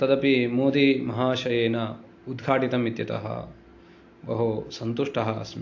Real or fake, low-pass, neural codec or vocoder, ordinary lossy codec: real; 7.2 kHz; none; AAC, 48 kbps